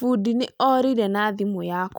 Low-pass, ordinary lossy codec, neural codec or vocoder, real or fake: none; none; none; real